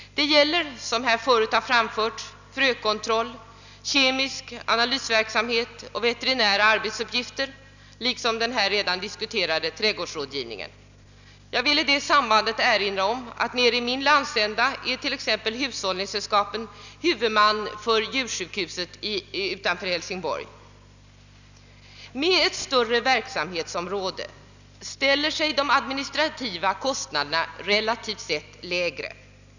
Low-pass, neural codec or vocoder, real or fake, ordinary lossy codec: 7.2 kHz; none; real; none